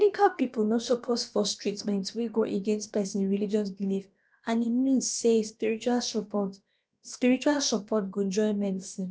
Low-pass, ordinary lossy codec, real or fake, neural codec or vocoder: none; none; fake; codec, 16 kHz, about 1 kbps, DyCAST, with the encoder's durations